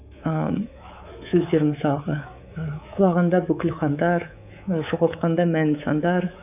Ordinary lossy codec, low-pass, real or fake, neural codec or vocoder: none; 3.6 kHz; fake; codec, 24 kHz, 3.1 kbps, DualCodec